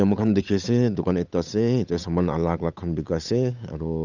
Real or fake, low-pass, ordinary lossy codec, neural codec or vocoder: fake; 7.2 kHz; none; codec, 16 kHz, 8 kbps, FunCodec, trained on Chinese and English, 25 frames a second